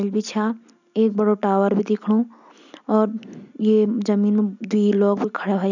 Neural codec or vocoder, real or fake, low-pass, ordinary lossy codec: none; real; 7.2 kHz; none